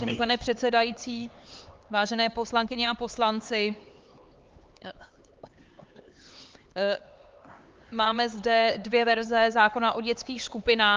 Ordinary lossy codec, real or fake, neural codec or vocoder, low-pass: Opus, 32 kbps; fake; codec, 16 kHz, 4 kbps, X-Codec, HuBERT features, trained on LibriSpeech; 7.2 kHz